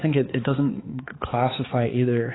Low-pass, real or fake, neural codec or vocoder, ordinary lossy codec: 7.2 kHz; fake; codec, 16 kHz, 4 kbps, X-Codec, HuBERT features, trained on balanced general audio; AAC, 16 kbps